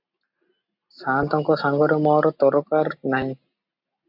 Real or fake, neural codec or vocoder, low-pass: real; none; 5.4 kHz